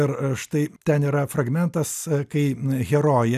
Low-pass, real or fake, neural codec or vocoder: 14.4 kHz; real; none